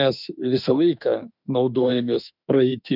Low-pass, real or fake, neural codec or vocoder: 5.4 kHz; fake; autoencoder, 48 kHz, 32 numbers a frame, DAC-VAE, trained on Japanese speech